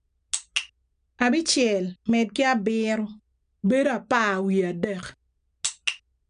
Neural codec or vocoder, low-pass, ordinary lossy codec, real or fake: none; 9.9 kHz; none; real